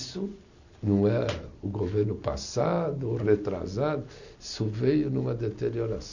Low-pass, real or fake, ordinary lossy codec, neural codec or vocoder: 7.2 kHz; real; none; none